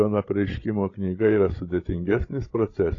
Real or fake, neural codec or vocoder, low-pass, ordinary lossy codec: fake; codec, 16 kHz, 16 kbps, FreqCodec, larger model; 7.2 kHz; AAC, 32 kbps